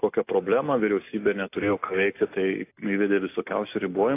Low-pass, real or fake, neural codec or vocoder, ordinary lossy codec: 3.6 kHz; real; none; AAC, 24 kbps